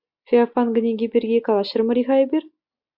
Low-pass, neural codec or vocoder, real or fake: 5.4 kHz; none; real